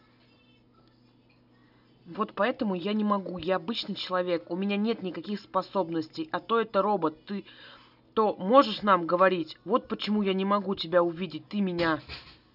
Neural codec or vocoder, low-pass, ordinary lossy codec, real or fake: none; 5.4 kHz; none; real